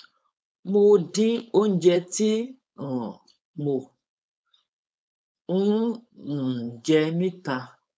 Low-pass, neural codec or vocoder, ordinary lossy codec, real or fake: none; codec, 16 kHz, 4.8 kbps, FACodec; none; fake